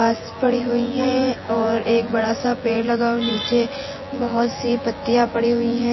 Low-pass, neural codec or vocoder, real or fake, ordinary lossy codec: 7.2 kHz; vocoder, 24 kHz, 100 mel bands, Vocos; fake; MP3, 24 kbps